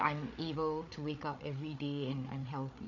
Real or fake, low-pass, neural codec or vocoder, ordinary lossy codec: fake; 7.2 kHz; codec, 16 kHz, 8 kbps, FreqCodec, larger model; none